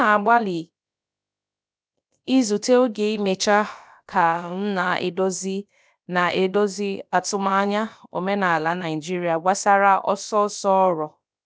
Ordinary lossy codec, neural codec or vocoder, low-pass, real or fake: none; codec, 16 kHz, about 1 kbps, DyCAST, with the encoder's durations; none; fake